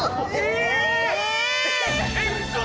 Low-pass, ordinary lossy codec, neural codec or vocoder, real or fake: none; none; none; real